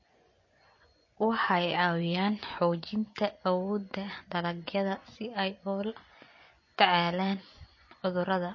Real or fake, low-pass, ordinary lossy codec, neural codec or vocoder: fake; 7.2 kHz; MP3, 32 kbps; vocoder, 24 kHz, 100 mel bands, Vocos